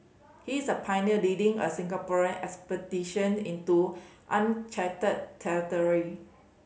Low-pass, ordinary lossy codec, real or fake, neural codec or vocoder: none; none; real; none